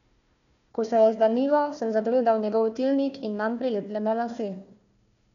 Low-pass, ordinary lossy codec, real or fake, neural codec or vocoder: 7.2 kHz; none; fake; codec, 16 kHz, 1 kbps, FunCodec, trained on Chinese and English, 50 frames a second